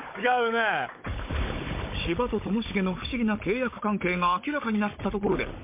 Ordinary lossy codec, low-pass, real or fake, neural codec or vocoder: MP3, 24 kbps; 3.6 kHz; fake; codec, 16 kHz, 16 kbps, FunCodec, trained on Chinese and English, 50 frames a second